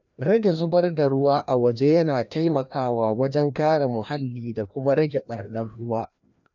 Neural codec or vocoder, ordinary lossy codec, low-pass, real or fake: codec, 16 kHz, 1 kbps, FreqCodec, larger model; none; 7.2 kHz; fake